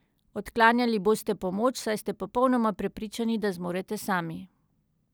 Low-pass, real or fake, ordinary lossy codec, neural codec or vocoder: none; real; none; none